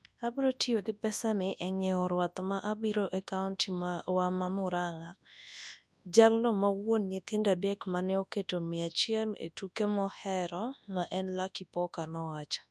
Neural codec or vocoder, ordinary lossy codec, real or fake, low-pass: codec, 24 kHz, 0.9 kbps, WavTokenizer, large speech release; none; fake; none